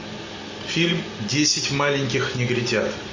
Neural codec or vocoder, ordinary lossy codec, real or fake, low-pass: none; MP3, 32 kbps; real; 7.2 kHz